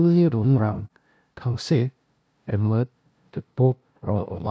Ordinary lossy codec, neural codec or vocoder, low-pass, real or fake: none; codec, 16 kHz, 0.5 kbps, FunCodec, trained on LibriTTS, 25 frames a second; none; fake